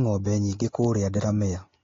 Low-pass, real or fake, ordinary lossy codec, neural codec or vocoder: 7.2 kHz; real; AAC, 32 kbps; none